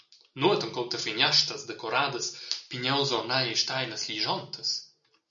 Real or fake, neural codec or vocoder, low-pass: real; none; 7.2 kHz